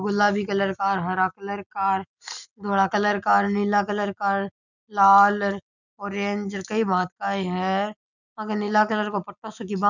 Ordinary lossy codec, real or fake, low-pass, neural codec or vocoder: none; real; 7.2 kHz; none